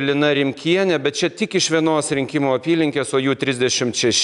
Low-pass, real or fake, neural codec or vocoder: 10.8 kHz; real; none